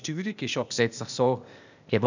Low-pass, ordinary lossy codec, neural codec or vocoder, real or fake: 7.2 kHz; none; codec, 16 kHz, 0.8 kbps, ZipCodec; fake